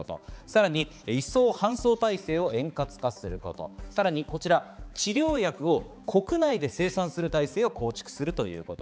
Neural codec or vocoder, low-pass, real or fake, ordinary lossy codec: codec, 16 kHz, 4 kbps, X-Codec, HuBERT features, trained on balanced general audio; none; fake; none